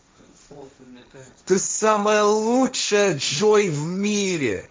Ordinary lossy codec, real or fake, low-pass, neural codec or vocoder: none; fake; none; codec, 16 kHz, 1.1 kbps, Voila-Tokenizer